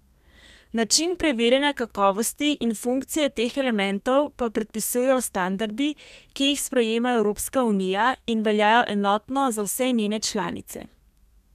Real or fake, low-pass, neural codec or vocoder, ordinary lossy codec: fake; 14.4 kHz; codec, 32 kHz, 1.9 kbps, SNAC; none